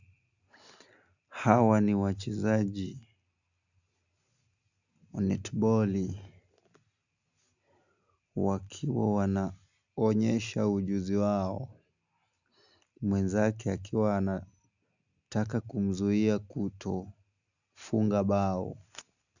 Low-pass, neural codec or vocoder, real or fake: 7.2 kHz; none; real